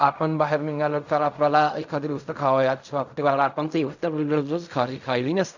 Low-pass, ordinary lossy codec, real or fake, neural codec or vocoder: 7.2 kHz; none; fake; codec, 16 kHz in and 24 kHz out, 0.4 kbps, LongCat-Audio-Codec, fine tuned four codebook decoder